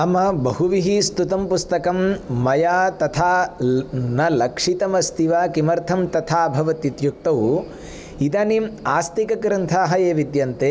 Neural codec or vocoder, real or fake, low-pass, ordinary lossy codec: none; real; none; none